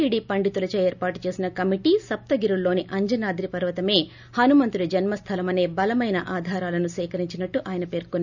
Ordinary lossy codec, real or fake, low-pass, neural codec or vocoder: none; real; 7.2 kHz; none